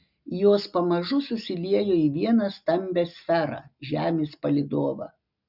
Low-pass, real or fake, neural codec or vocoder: 5.4 kHz; real; none